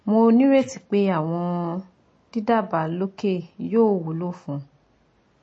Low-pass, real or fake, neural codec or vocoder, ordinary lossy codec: 9.9 kHz; real; none; MP3, 32 kbps